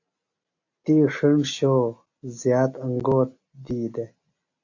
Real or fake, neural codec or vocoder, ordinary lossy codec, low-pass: real; none; AAC, 48 kbps; 7.2 kHz